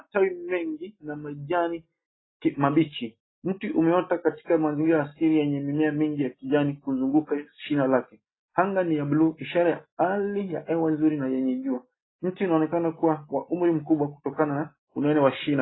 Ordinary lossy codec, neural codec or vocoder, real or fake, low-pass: AAC, 16 kbps; none; real; 7.2 kHz